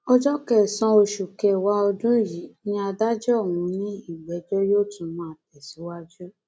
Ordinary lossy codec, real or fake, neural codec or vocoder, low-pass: none; real; none; none